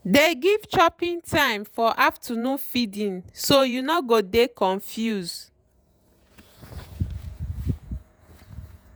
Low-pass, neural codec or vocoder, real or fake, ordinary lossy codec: none; vocoder, 48 kHz, 128 mel bands, Vocos; fake; none